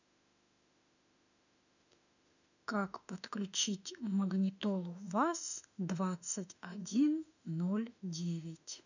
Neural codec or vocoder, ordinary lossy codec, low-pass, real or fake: autoencoder, 48 kHz, 32 numbers a frame, DAC-VAE, trained on Japanese speech; none; 7.2 kHz; fake